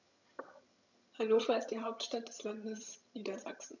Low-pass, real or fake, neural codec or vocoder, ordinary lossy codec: 7.2 kHz; fake; vocoder, 22.05 kHz, 80 mel bands, HiFi-GAN; none